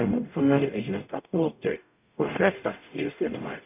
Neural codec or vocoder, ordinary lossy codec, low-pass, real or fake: codec, 44.1 kHz, 0.9 kbps, DAC; none; 3.6 kHz; fake